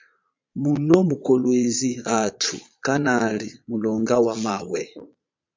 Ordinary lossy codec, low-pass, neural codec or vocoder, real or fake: MP3, 64 kbps; 7.2 kHz; vocoder, 22.05 kHz, 80 mel bands, Vocos; fake